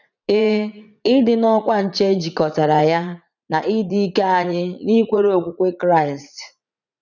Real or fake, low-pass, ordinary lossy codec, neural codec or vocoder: fake; 7.2 kHz; none; vocoder, 44.1 kHz, 80 mel bands, Vocos